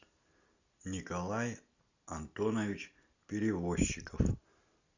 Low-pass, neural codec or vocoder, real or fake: 7.2 kHz; none; real